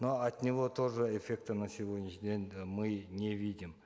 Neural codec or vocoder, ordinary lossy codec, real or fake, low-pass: none; none; real; none